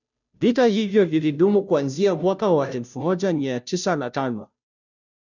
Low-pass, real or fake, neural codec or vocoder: 7.2 kHz; fake; codec, 16 kHz, 0.5 kbps, FunCodec, trained on Chinese and English, 25 frames a second